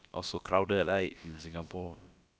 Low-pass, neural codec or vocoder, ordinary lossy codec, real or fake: none; codec, 16 kHz, about 1 kbps, DyCAST, with the encoder's durations; none; fake